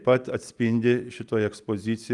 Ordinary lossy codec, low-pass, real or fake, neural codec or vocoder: Opus, 32 kbps; 10.8 kHz; real; none